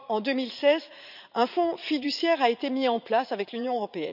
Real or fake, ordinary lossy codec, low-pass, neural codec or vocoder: fake; none; 5.4 kHz; vocoder, 44.1 kHz, 80 mel bands, Vocos